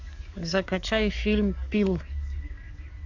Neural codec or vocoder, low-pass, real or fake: codec, 44.1 kHz, 7.8 kbps, DAC; 7.2 kHz; fake